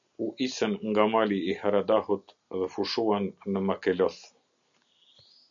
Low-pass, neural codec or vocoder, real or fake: 7.2 kHz; none; real